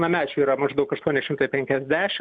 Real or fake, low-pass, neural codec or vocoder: real; 9.9 kHz; none